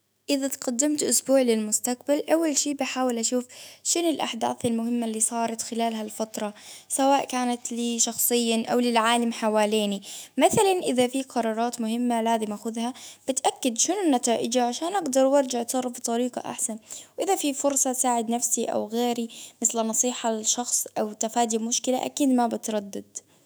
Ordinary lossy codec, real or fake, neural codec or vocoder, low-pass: none; fake; autoencoder, 48 kHz, 128 numbers a frame, DAC-VAE, trained on Japanese speech; none